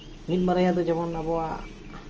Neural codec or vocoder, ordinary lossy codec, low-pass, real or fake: none; Opus, 24 kbps; 7.2 kHz; real